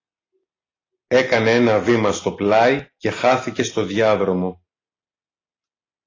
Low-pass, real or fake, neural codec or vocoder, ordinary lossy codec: 7.2 kHz; real; none; AAC, 32 kbps